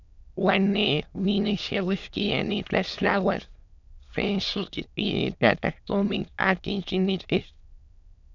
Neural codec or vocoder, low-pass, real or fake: autoencoder, 22.05 kHz, a latent of 192 numbers a frame, VITS, trained on many speakers; 7.2 kHz; fake